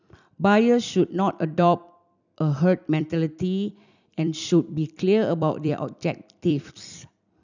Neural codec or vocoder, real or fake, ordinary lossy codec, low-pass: vocoder, 44.1 kHz, 128 mel bands every 256 samples, BigVGAN v2; fake; none; 7.2 kHz